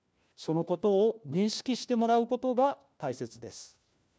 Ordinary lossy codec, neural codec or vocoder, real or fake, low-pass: none; codec, 16 kHz, 1 kbps, FunCodec, trained on LibriTTS, 50 frames a second; fake; none